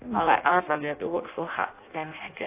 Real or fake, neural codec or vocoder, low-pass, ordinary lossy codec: fake; codec, 16 kHz in and 24 kHz out, 0.6 kbps, FireRedTTS-2 codec; 3.6 kHz; AAC, 24 kbps